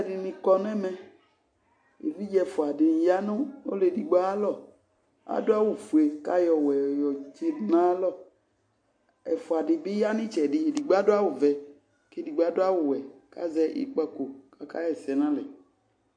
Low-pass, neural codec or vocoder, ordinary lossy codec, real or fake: 9.9 kHz; none; MP3, 48 kbps; real